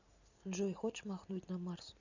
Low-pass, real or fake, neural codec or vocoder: 7.2 kHz; real; none